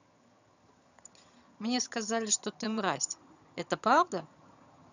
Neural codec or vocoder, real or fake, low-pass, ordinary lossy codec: vocoder, 22.05 kHz, 80 mel bands, HiFi-GAN; fake; 7.2 kHz; none